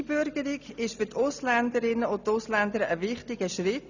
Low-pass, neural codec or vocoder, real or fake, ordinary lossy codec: 7.2 kHz; none; real; none